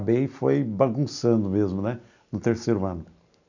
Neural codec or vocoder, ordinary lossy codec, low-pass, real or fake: none; none; 7.2 kHz; real